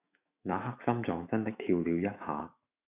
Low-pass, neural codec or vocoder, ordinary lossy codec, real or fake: 3.6 kHz; autoencoder, 48 kHz, 128 numbers a frame, DAC-VAE, trained on Japanese speech; Opus, 64 kbps; fake